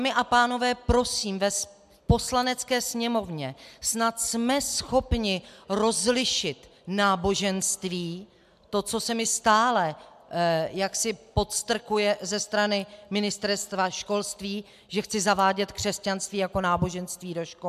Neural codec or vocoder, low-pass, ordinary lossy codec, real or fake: none; 14.4 kHz; MP3, 96 kbps; real